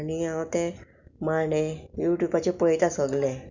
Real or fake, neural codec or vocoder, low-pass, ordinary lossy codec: real; none; 7.2 kHz; none